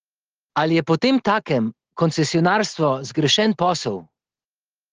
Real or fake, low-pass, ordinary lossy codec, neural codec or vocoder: real; 7.2 kHz; Opus, 16 kbps; none